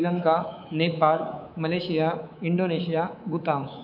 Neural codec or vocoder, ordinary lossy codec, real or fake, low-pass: codec, 24 kHz, 3.1 kbps, DualCodec; none; fake; 5.4 kHz